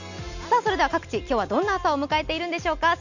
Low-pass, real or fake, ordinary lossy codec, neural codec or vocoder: 7.2 kHz; real; none; none